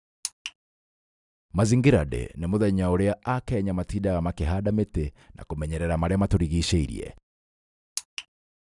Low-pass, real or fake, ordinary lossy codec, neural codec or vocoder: 10.8 kHz; real; none; none